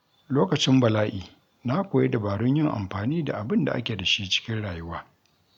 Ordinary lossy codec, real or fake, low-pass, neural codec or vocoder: none; real; 19.8 kHz; none